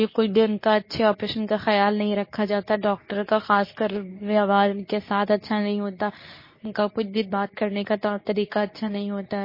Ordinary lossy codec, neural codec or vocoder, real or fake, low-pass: MP3, 24 kbps; codec, 24 kHz, 0.9 kbps, WavTokenizer, medium speech release version 2; fake; 5.4 kHz